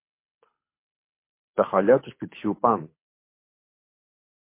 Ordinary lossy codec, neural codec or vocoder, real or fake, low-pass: MP3, 24 kbps; codec, 24 kHz, 6 kbps, HILCodec; fake; 3.6 kHz